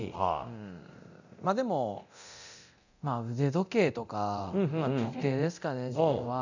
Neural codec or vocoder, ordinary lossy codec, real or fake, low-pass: codec, 24 kHz, 0.9 kbps, DualCodec; none; fake; 7.2 kHz